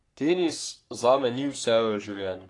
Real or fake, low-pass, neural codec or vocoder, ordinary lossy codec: fake; 10.8 kHz; codec, 44.1 kHz, 3.4 kbps, Pupu-Codec; AAC, 48 kbps